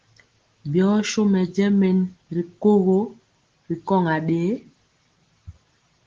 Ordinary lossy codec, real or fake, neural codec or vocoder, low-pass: Opus, 16 kbps; real; none; 7.2 kHz